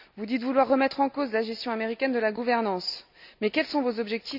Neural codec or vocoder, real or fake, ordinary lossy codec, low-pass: none; real; none; 5.4 kHz